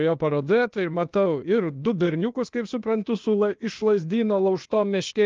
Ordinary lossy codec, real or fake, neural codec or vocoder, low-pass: Opus, 32 kbps; fake; codec, 16 kHz, 0.8 kbps, ZipCodec; 7.2 kHz